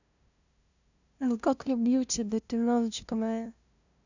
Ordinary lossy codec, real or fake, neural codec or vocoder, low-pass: none; fake; codec, 16 kHz, 0.5 kbps, FunCodec, trained on LibriTTS, 25 frames a second; 7.2 kHz